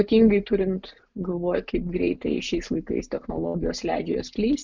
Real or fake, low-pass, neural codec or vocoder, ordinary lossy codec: real; 7.2 kHz; none; MP3, 64 kbps